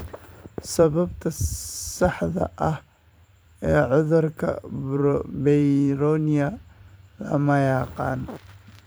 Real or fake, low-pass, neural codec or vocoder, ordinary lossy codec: fake; none; vocoder, 44.1 kHz, 128 mel bands every 512 samples, BigVGAN v2; none